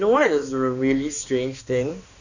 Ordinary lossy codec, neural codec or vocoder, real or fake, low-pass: none; codec, 16 kHz, 2 kbps, X-Codec, HuBERT features, trained on balanced general audio; fake; 7.2 kHz